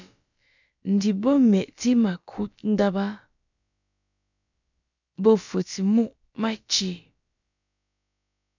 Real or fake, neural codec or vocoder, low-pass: fake; codec, 16 kHz, about 1 kbps, DyCAST, with the encoder's durations; 7.2 kHz